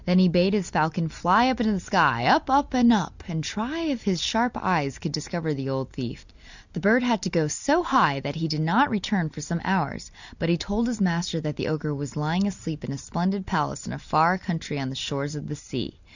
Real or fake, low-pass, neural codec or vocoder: real; 7.2 kHz; none